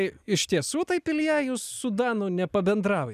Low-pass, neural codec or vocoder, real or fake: 14.4 kHz; none; real